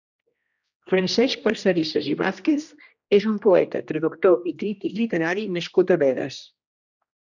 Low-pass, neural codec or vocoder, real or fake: 7.2 kHz; codec, 16 kHz, 1 kbps, X-Codec, HuBERT features, trained on general audio; fake